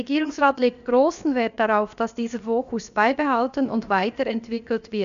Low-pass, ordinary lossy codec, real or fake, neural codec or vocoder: 7.2 kHz; none; fake; codec, 16 kHz, about 1 kbps, DyCAST, with the encoder's durations